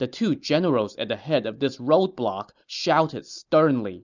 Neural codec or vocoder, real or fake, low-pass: none; real; 7.2 kHz